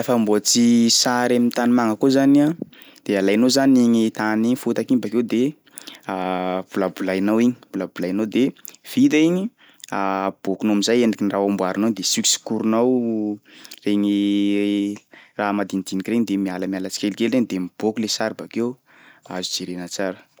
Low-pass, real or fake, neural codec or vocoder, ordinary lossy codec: none; real; none; none